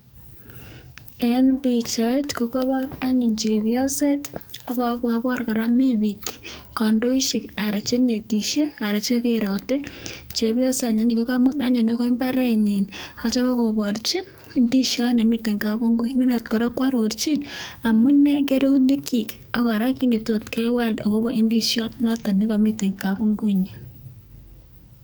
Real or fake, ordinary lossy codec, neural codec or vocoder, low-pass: fake; none; codec, 44.1 kHz, 2.6 kbps, SNAC; none